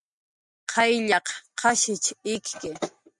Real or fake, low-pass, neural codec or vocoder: real; 10.8 kHz; none